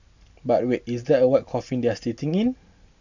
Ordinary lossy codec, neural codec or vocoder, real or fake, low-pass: none; none; real; 7.2 kHz